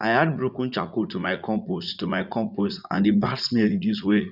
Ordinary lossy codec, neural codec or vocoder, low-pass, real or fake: none; vocoder, 44.1 kHz, 80 mel bands, Vocos; 5.4 kHz; fake